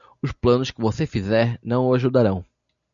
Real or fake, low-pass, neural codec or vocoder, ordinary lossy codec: real; 7.2 kHz; none; MP3, 96 kbps